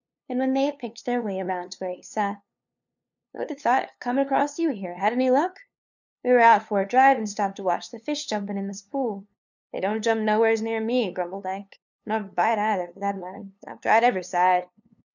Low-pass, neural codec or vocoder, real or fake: 7.2 kHz; codec, 16 kHz, 2 kbps, FunCodec, trained on LibriTTS, 25 frames a second; fake